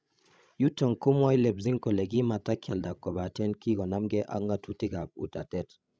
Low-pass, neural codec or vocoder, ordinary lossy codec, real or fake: none; codec, 16 kHz, 16 kbps, FreqCodec, larger model; none; fake